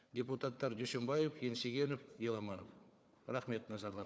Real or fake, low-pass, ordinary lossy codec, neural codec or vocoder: fake; none; none; codec, 16 kHz, 4 kbps, FunCodec, trained on Chinese and English, 50 frames a second